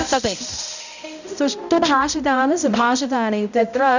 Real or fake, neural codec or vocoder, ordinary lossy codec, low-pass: fake; codec, 16 kHz, 0.5 kbps, X-Codec, HuBERT features, trained on balanced general audio; none; 7.2 kHz